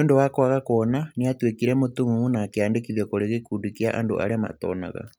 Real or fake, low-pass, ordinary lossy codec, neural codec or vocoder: real; none; none; none